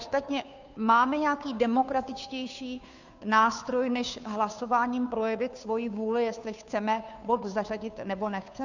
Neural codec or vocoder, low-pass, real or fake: codec, 16 kHz, 2 kbps, FunCodec, trained on Chinese and English, 25 frames a second; 7.2 kHz; fake